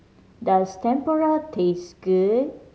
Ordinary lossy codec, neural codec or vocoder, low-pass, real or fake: none; none; none; real